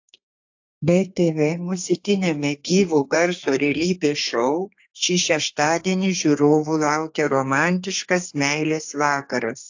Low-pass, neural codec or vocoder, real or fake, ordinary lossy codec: 7.2 kHz; codec, 32 kHz, 1.9 kbps, SNAC; fake; AAC, 48 kbps